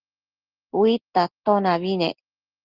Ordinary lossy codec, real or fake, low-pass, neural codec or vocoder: Opus, 16 kbps; real; 5.4 kHz; none